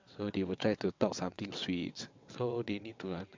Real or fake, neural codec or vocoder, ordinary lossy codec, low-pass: fake; vocoder, 22.05 kHz, 80 mel bands, WaveNeXt; MP3, 64 kbps; 7.2 kHz